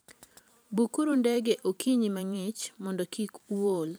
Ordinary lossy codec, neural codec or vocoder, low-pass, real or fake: none; vocoder, 44.1 kHz, 128 mel bands every 512 samples, BigVGAN v2; none; fake